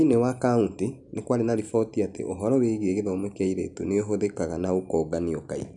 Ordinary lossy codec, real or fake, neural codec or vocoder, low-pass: none; real; none; 10.8 kHz